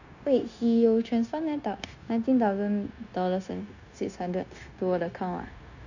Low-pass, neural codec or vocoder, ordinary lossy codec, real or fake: 7.2 kHz; codec, 16 kHz, 0.9 kbps, LongCat-Audio-Codec; none; fake